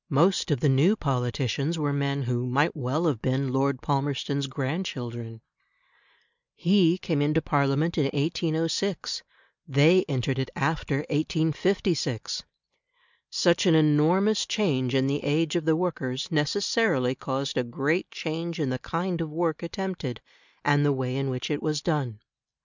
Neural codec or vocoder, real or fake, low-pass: none; real; 7.2 kHz